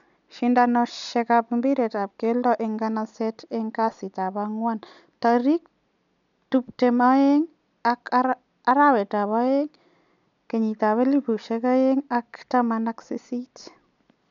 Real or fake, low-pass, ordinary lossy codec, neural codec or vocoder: real; 7.2 kHz; none; none